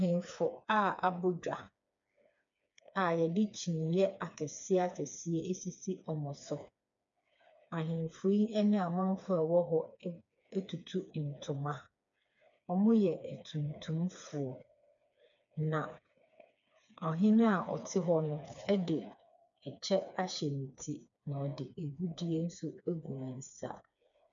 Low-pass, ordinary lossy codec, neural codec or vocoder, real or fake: 7.2 kHz; MP3, 64 kbps; codec, 16 kHz, 4 kbps, FreqCodec, smaller model; fake